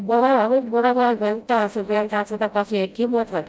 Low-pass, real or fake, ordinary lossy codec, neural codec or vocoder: none; fake; none; codec, 16 kHz, 0.5 kbps, FreqCodec, smaller model